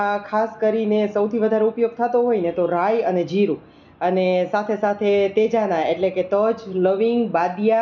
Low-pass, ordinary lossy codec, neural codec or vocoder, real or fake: 7.2 kHz; none; none; real